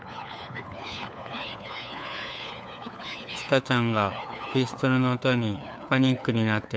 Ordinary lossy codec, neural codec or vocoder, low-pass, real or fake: none; codec, 16 kHz, 4 kbps, FunCodec, trained on LibriTTS, 50 frames a second; none; fake